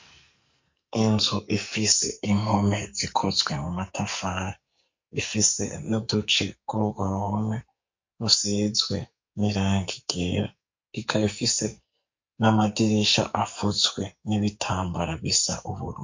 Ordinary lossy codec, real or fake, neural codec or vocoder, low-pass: MP3, 48 kbps; fake; codec, 44.1 kHz, 2.6 kbps, SNAC; 7.2 kHz